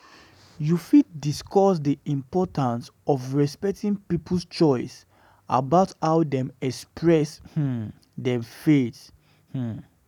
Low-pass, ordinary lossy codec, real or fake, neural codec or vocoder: 19.8 kHz; none; real; none